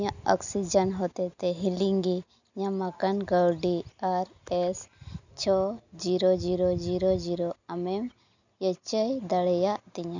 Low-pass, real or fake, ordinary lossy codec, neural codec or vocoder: 7.2 kHz; real; none; none